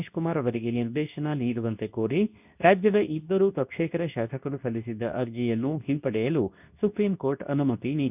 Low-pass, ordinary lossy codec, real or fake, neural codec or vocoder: 3.6 kHz; none; fake; codec, 24 kHz, 0.9 kbps, WavTokenizer, medium speech release version 2